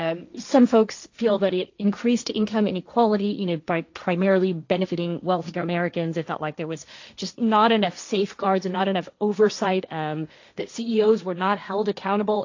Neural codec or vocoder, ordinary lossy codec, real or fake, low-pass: codec, 16 kHz, 1.1 kbps, Voila-Tokenizer; AAC, 48 kbps; fake; 7.2 kHz